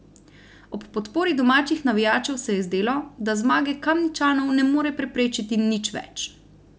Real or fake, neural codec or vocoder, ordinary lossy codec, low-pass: real; none; none; none